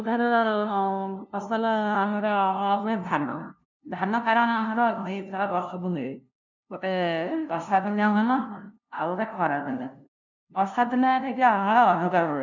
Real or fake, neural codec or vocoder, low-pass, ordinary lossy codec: fake; codec, 16 kHz, 0.5 kbps, FunCodec, trained on LibriTTS, 25 frames a second; 7.2 kHz; none